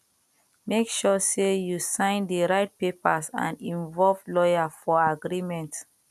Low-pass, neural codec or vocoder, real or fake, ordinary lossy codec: 14.4 kHz; none; real; none